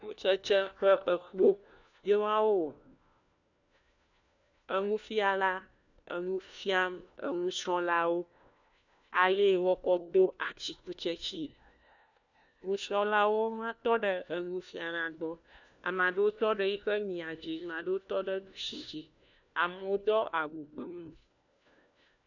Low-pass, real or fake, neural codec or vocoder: 7.2 kHz; fake; codec, 16 kHz, 1 kbps, FunCodec, trained on LibriTTS, 50 frames a second